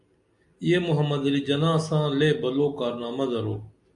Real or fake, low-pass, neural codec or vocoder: real; 10.8 kHz; none